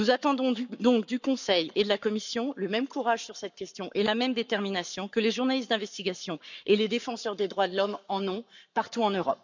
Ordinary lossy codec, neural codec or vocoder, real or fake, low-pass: none; codec, 44.1 kHz, 7.8 kbps, Pupu-Codec; fake; 7.2 kHz